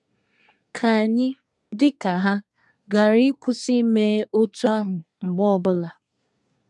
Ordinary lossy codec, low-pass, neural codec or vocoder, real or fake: none; 10.8 kHz; codec, 24 kHz, 1 kbps, SNAC; fake